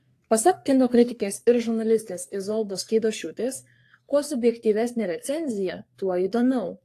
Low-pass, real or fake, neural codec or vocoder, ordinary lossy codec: 14.4 kHz; fake; codec, 44.1 kHz, 3.4 kbps, Pupu-Codec; AAC, 64 kbps